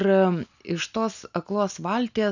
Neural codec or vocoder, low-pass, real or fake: none; 7.2 kHz; real